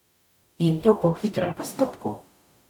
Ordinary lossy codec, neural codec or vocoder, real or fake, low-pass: none; codec, 44.1 kHz, 0.9 kbps, DAC; fake; 19.8 kHz